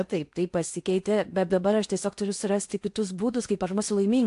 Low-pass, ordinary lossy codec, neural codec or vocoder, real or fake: 10.8 kHz; AAC, 64 kbps; codec, 16 kHz in and 24 kHz out, 0.8 kbps, FocalCodec, streaming, 65536 codes; fake